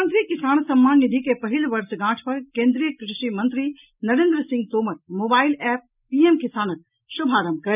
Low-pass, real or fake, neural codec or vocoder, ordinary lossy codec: 3.6 kHz; real; none; none